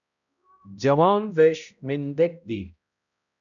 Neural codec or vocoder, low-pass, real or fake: codec, 16 kHz, 0.5 kbps, X-Codec, HuBERT features, trained on balanced general audio; 7.2 kHz; fake